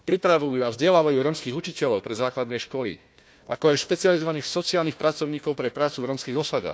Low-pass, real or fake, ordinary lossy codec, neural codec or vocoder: none; fake; none; codec, 16 kHz, 1 kbps, FunCodec, trained on Chinese and English, 50 frames a second